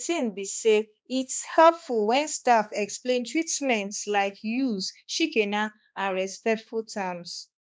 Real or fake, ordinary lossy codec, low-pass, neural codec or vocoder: fake; none; none; codec, 16 kHz, 2 kbps, X-Codec, HuBERT features, trained on balanced general audio